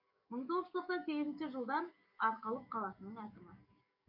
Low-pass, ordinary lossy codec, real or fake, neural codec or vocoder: 5.4 kHz; none; fake; codec, 44.1 kHz, 7.8 kbps, DAC